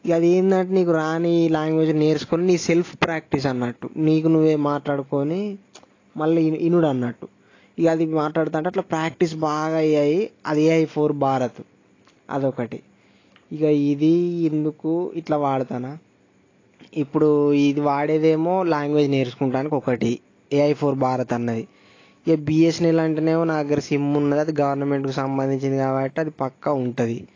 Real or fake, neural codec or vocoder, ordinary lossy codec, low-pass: real; none; AAC, 32 kbps; 7.2 kHz